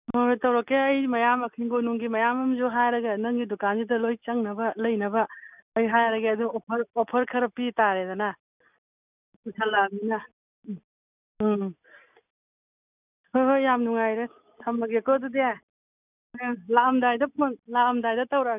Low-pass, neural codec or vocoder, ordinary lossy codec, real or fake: 3.6 kHz; none; none; real